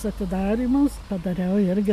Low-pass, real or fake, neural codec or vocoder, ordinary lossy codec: 14.4 kHz; real; none; MP3, 96 kbps